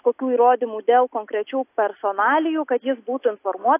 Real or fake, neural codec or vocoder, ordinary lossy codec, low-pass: real; none; AAC, 24 kbps; 3.6 kHz